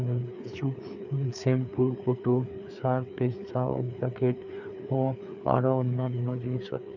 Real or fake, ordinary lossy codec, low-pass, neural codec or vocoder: fake; none; 7.2 kHz; codec, 16 kHz, 4 kbps, FreqCodec, larger model